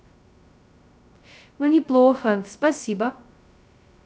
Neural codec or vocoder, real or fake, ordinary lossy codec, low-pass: codec, 16 kHz, 0.2 kbps, FocalCodec; fake; none; none